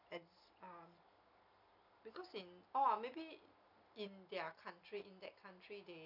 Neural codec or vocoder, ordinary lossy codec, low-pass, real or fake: none; none; 5.4 kHz; real